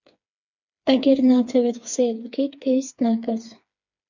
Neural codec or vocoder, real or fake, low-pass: codec, 16 kHz, 4 kbps, FreqCodec, smaller model; fake; 7.2 kHz